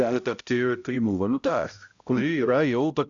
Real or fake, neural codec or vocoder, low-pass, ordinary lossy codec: fake; codec, 16 kHz, 0.5 kbps, X-Codec, HuBERT features, trained on balanced general audio; 7.2 kHz; Opus, 64 kbps